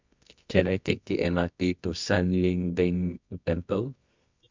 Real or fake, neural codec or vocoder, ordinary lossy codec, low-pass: fake; codec, 24 kHz, 0.9 kbps, WavTokenizer, medium music audio release; MP3, 64 kbps; 7.2 kHz